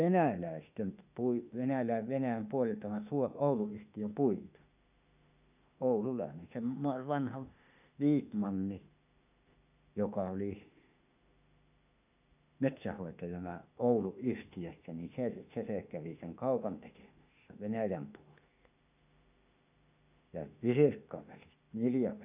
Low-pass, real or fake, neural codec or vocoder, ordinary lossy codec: 3.6 kHz; fake; autoencoder, 48 kHz, 32 numbers a frame, DAC-VAE, trained on Japanese speech; none